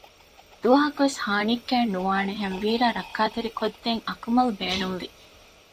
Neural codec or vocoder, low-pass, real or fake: vocoder, 44.1 kHz, 128 mel bands, Pupu-Vocoder; 14.4 kHz; fake